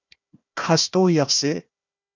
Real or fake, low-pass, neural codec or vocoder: fake; 7.2 kHz; codec, 16 kHz, 1 kbps, FunCodec, trained on Chinese and English, 50 frames a second